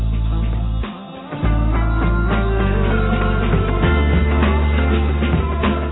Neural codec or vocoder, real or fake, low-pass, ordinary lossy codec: none; real; 7.2 kHz; AAC, 16 kbps